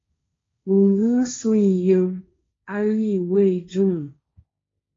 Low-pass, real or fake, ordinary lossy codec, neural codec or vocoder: 7.2 kHz; fake; AAC, 32 kbps; codec, 16 kHz, 1.1 kbps, Voila-Tokenizer